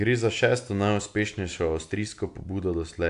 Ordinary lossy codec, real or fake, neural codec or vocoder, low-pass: AAC, 64 kbps; real; none; 10.8 kHz